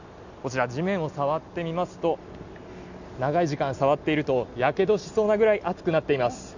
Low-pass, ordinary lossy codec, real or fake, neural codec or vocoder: 7.2 kHz; none; real; none